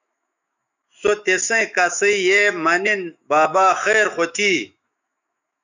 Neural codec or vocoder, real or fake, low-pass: autoencoder, 48 kHz, 128 numbers a frame, DAC-VAE, trained on Japanese speech; fake; 7.2 kHz